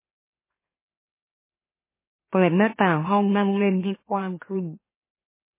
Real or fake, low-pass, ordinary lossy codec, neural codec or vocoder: fake; 3.6 kHz; MP3, 16 kbps; autoencoder, 44.1 kHz, a latent of 192 numbers a frame, MeloTTS